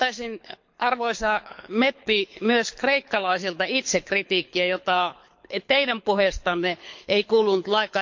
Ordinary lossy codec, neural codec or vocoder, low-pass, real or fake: MP3, 48 kbps; codec, 24 kHz, 6 kbps, HILCodec; 7.2 kHz; fake